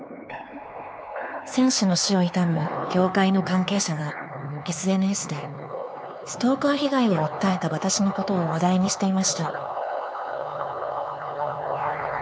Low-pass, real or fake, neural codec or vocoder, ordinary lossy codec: none; fake; codec, 16 kHz, 4 kbps, X-Codec, HuBERT features, trained on LibriSpeech; none